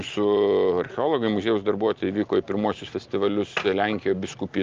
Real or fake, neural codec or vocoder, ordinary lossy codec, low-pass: real; none; Opus, 32 kbps; 7.2 kHz